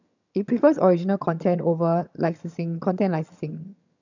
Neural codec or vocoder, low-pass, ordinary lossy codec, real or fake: vocoder, 22.05 kHz, 80 mel bands, HiFi-GAN; 7.2 kHz; none; fake